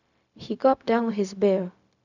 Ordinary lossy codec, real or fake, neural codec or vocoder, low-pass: none; fake; codec, 16 kHz, 0.4 kbps, LongCat-Audio-Codec; 7.2 kHz